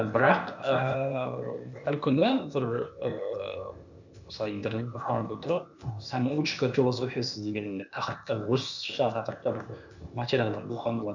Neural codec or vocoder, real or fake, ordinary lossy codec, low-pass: codec, 16 kHz, 0.8 kbps, ZipCodec; fake; none; 7.2 kHz